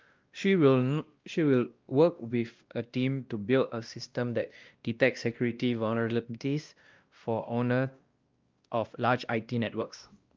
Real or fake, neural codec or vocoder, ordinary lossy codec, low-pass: fake; codec, 16 kHz, 1 kbps, X-Codec, WavLM features, trained on Multilingual LibriSpeech; Opus, 24 kbps; 7.2 kHz